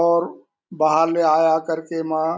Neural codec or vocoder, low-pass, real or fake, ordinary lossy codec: none; none; real; none